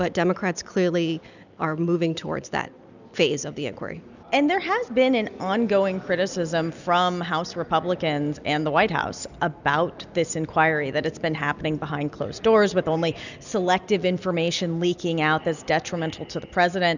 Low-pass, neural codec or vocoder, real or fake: 7.2 kHz; none; real